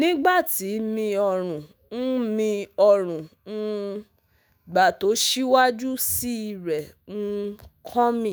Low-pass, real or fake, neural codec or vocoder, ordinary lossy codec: none; fake; autoencoder, 48 kHz, 128 numbers a frame, DAC-VAE, trained on Japanese speech; none